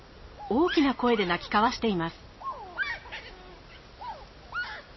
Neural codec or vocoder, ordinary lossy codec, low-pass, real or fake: none; MP3, 24 kbps; 7.2 kHz; real